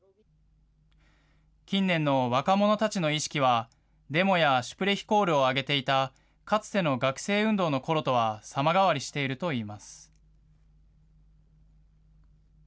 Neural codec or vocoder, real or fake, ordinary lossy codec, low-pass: none; real; none; none